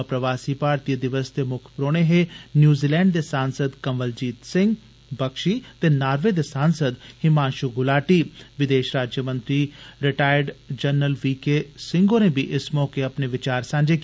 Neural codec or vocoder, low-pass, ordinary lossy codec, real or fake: none; none; none; real